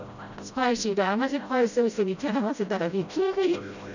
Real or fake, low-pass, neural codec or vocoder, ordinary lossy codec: fake; 7.2 kHz; codec, 16 kHz, 0.5 kbps, FreqCodec, smaller model; none